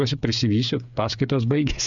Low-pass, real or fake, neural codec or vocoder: 7.2 kHz; fake; codec, 16 kHz, 8 kbps, FreqCodec, smaller model